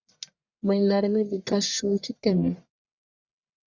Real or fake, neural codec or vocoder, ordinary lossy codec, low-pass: fake; codec, 44.1 kHz, 1.7 kbps, Pupu-Codec; Opus, 64 kbps; 7.2 kHz